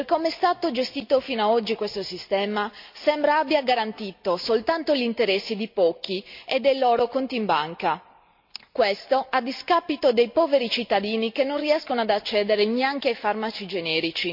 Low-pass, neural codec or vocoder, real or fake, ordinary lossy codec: 5.4 kHz; none; real; MP3, 48 kbps